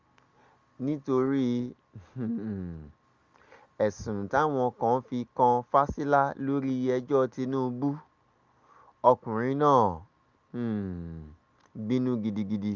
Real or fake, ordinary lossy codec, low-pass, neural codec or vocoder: real; Opus, 32 kbps; 7.2 kHz; none